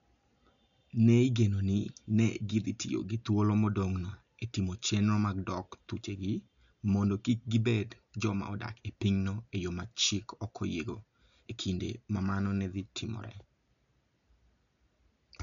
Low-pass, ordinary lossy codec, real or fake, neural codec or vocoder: 7.2 kHz; none; real; none